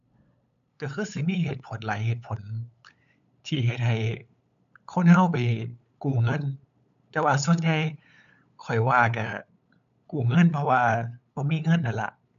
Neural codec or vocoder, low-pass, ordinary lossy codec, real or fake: codec, 16 kHz, 8 kbps, FunCodec, trained on LibriTTS, 25 frames a second; 7.2 kHz; none; fake